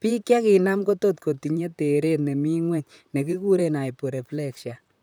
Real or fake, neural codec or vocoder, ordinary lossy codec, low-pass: fake; vocoder, 44.1 kHz, 128 mel bands, Pupu-Vocoder; none; none